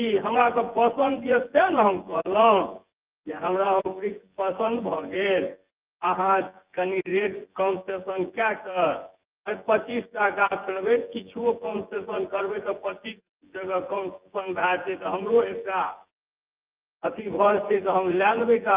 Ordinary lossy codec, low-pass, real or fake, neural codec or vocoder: Opus, 32 kbps; 3.6 kHz; fake; vocoder, 24 kHz, 100 mel bands, Vocos